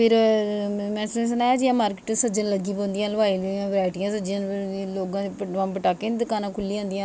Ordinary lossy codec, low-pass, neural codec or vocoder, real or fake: none; none; none; real